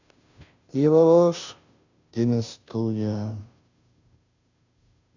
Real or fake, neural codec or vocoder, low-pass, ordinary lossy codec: fake; codec, 16 kHz, 0.5 kbps, FunCodec, trained on Chinese and English, 25 frames a second; 7.2 kHz; none